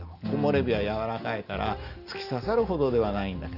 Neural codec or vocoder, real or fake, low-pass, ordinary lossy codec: none; real; 5.4 kHz; Opus, 32 kbps